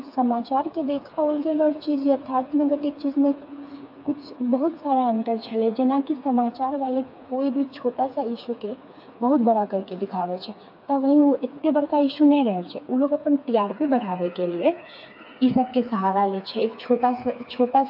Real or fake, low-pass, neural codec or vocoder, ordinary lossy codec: fake; 5.4 kHz; codec, 16 kHz, 4 kbps, FreqCodec, smaller model; AAC, 48 kbps